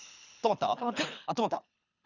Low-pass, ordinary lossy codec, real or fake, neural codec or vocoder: 7.2 kHz; none; fake; codec, 24 kHz, 3 kbps, HILCodec